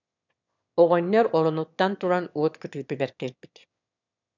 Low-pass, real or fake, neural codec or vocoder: 7.2 kHz; fake; autoencoder, 22.05 kHz, a latent of 192 numbers a frame, VITS, trained on one speaker